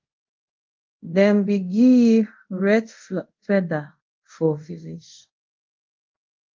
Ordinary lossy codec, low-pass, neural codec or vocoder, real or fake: Opus, 32 kbps; 7.2 kHz; codec, 24 kHz, 0.5 kbps, DualCodec; fake